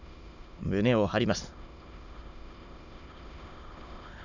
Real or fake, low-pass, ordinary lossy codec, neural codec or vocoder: fake; 7.2 kHz; none; autoencoder, 22.05 kHz, a latent of 192 numbers a frame, VITS, trained on many speakers